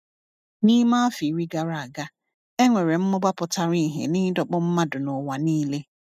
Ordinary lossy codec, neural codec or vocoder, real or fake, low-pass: none; none; real; 14.4 kHz